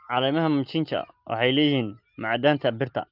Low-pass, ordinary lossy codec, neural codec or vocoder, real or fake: 5.4 kHz; Opus, 32 kbps; none; real